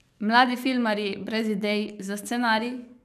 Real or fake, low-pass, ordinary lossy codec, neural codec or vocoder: fake; 14.4 kHz; none; codec, 44.1 kHz, 7.8 kbps, DAC